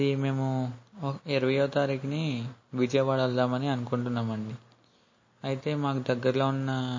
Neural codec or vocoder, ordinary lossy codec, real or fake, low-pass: none; MP3, 32 kbps; real; 7.2 kHz